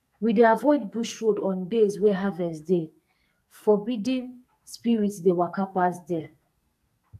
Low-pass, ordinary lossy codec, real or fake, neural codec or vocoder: 14.4 kHz; none; fake; codec, 44.1 kHz, 2.6 kbps, SNAC